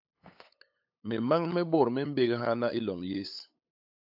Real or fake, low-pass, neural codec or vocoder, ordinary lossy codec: fake; 5.4 kHz; codec, 16 kHz, 8 kbps, FunCodec, trained on LibriTTS, 25 frames a second; AAC, 48 kbps